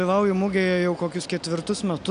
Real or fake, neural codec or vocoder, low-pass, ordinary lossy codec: real; none; 9.9 kHz; AAC, 96 kbps